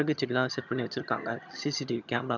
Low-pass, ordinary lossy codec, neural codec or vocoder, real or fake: 7.2 kHz; none; vocoder, 22.05 kHz, 80 mel bands, HiFi-GAN; fake